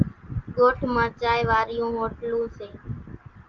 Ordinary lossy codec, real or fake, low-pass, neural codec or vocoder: Opus, 32 kbps; real; 7.2 kHz; none